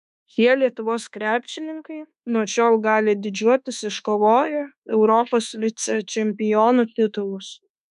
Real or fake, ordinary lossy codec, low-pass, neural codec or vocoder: fake; MP3, 96 kbps; 10.8 kHz; codec, 24 kHz, 1.2 kbps, DualCodec